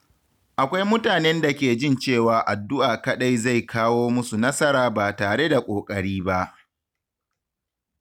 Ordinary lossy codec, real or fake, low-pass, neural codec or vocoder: none; real; 19.8 kHz; none